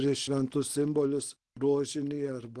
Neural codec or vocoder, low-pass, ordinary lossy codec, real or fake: none; 10.8 kHz; Opus, 16 kbps; real